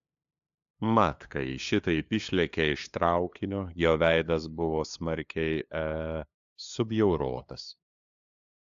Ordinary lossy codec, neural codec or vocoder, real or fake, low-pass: AAC, 64 kbps; codec, 16 kHz, 2 kbps, FunCodec, trained on LibriTTS, 25 frames a second; fake; 7.2 kHz